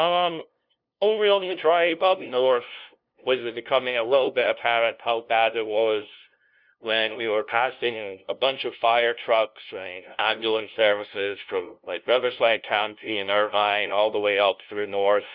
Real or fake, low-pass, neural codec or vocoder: fake; 5.4 kHz; codec, 16 kHz, 0.5 kbps, FunCodec, trained on LibriTTS, 25 frames a second